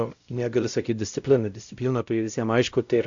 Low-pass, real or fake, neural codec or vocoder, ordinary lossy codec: 7.2 kHz; fake; codec, 16 kHz, 0.5 kbps, X-Codec, WavLM features, trained on Multilingual LibriSpeech; AAC, 64 kbps